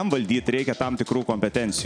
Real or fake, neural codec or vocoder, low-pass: real; none; 9.9 kHz